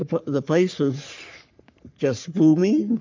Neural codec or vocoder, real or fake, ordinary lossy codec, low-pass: codec, 44.1 kHz, 3.4 kbps, Pupu-Codec; fake; MP3, 64 kbps; 7.2 kHz